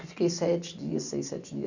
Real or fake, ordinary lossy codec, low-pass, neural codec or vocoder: real; none; 7.2 kHz; none